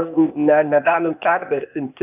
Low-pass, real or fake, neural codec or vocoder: 3.6 kHz; fake; codec, 16 kHz, 0.8 kbps, ZipCodec